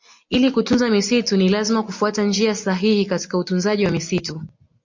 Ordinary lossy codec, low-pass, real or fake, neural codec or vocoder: MP3, 48 kbps; 7.2 kHz; real; none